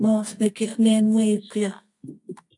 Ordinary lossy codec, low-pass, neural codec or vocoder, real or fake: AAC, 64 kbps; 10.8 kHz; codec, 24 kHz, 0.9 kbps, WavTokenizer, medium music audio release; fake